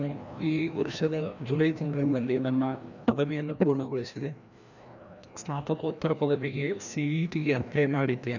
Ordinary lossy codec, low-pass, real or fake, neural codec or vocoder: none; 7.2 kHz; fake; codec, 16 kHz, 1 kbps, FreqCodec, larger model